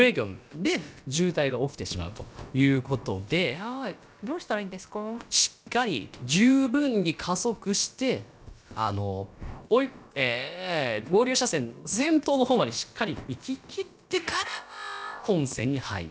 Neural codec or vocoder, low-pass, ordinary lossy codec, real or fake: codec, 16 kHz, about 1 kbps, DyCAST, with the encoder's durations; none; none; fake